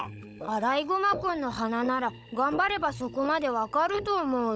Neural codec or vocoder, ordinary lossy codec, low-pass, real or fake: codec, 16 kHz, 16 kbps, FunCodec, trained on Chinese and English, 50 frames a second; none; none; fake